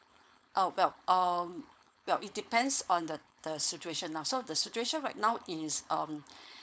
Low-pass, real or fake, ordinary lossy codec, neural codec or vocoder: none; fake; none; codec, 16 kHz, 4.8 kbps, FACodec